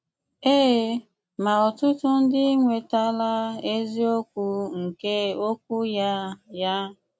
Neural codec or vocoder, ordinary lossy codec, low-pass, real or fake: none; none; none; real